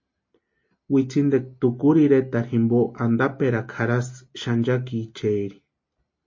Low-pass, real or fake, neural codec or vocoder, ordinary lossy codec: 7.2 kHz; real; none; MP3, 32 kbps